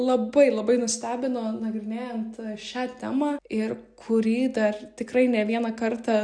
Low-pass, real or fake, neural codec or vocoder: 9.9 kHz; real; none